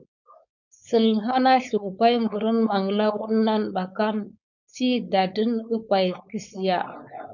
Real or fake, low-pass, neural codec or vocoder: fake; 7.2 kHz; codec, 16 kHz, 4.8 kbps, FACodec